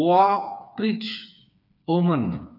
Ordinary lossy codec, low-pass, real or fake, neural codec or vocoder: none; 5.4 kHz; fake; codec, 16 kHz, 4 kbps, FreqCodec, smaller model